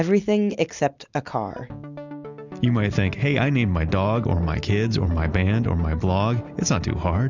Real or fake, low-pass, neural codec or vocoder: real; 7.2 kHz; none